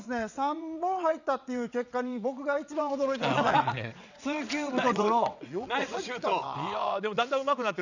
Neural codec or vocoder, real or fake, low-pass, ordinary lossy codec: vocoder, 22.05 kHz, 80 mel bands, WaveNeXt; fake; 7.2 kHz; none